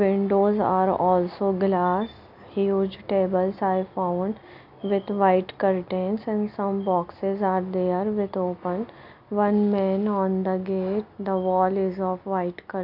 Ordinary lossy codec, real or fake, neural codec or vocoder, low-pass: MP3, 48 kbps; real; none; 5.4 kHz